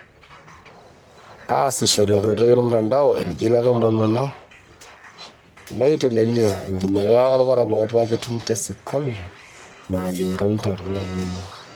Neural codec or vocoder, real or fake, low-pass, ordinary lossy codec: codec, 44.1 kHz, 1.7 kbps, Pupu-Codec; fake; none; none